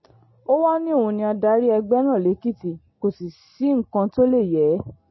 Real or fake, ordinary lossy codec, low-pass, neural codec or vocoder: real; MP3, 24 kbps; 7.2 kHz; none